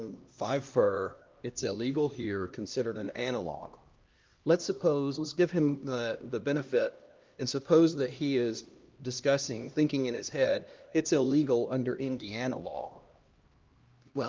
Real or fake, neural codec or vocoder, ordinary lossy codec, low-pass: fake; codec, 16 kHz, 1 kbps, X-Codec, HuBERT features, trained on LibriSpeech; Opus, 32 kbps; 7.2 kHz